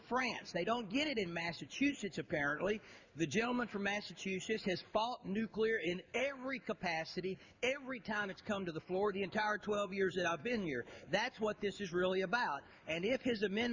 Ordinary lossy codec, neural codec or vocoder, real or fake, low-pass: Opus, 64 kbps; codec, 16 kHz in and 24 kHz out, 1 kbps, XY-Tokenizer; fake; 7.2 kHz